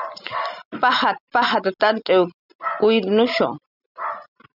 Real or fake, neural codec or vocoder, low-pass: real; none; 5.4 kHz